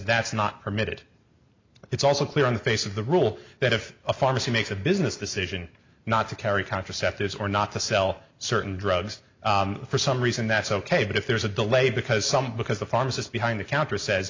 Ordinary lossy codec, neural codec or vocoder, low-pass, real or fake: MP3, 64 kbps; none; 7.2 kHz; real